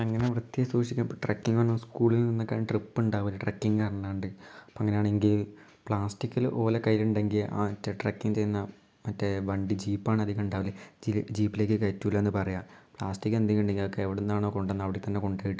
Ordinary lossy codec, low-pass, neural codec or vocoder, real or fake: none; none; none; real